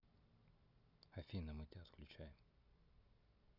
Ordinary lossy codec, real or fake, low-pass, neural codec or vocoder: none; real; 5.4 kHz; none